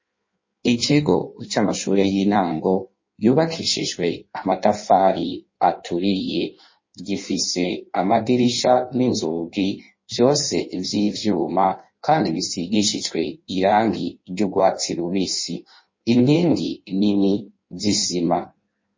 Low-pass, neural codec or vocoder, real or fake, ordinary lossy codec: 7.2 kHz; codec, 16 kHz in and 24 kHz out, 1.1 kbps, FireRedTTS-2 codec; fake; MP3, 32 kbps